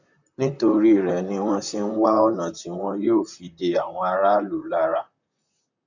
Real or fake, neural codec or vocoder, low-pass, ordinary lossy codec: fake; vocoder, 44.1 kHz, 128 mel bands, Pupu-Vocoder; 7.2 kHz; none